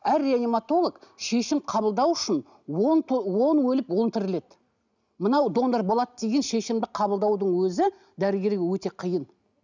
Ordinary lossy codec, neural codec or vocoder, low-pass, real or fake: none; none; 7.2 kHz; real